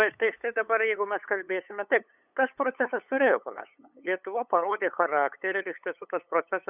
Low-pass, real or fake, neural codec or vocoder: 3.6 kHz; fake; codec, 16 kHz, 8 kbps, FunCodec, trained on LibriTTS, 25 frames a second